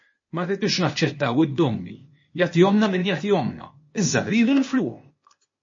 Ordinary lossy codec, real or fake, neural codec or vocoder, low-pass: MP3, 32 kbps; fake; codec, 16 kHz, 0.8 kbps, ZipCodec; 7.2 kHz